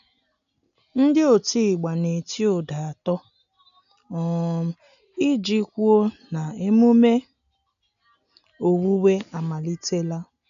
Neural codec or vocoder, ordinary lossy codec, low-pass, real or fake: none; none; 7.2 kHz; real